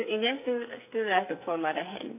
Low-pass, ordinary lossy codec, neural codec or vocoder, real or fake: 3.6 kHz; MP3, 24 kbps; codec, 44.1 kHz, 2.6 kbps, SNAC; fake